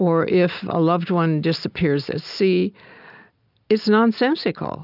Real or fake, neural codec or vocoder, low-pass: real; none; 5.4 kHz